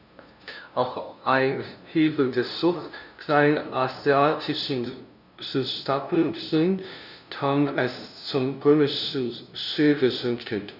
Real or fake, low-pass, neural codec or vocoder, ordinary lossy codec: fake; 5.4 kHz; codec, 16 kHz, 0.5 kbps, FunCodec, trained on LibriTTS, 25 frames a second; none